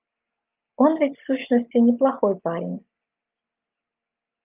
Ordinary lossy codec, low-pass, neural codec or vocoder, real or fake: Opus, 32 kbps; 3.6 kHz; none; real